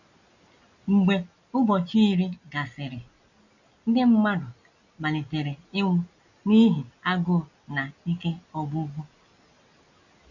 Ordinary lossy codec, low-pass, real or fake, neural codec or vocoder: none; 7.2 kHz; real; none